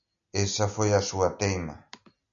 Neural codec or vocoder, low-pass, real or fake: none; 7.2 kHz; real